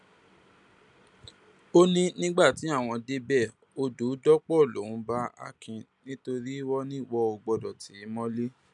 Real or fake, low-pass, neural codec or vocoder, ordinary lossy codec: real; 10.8 kHz; none; none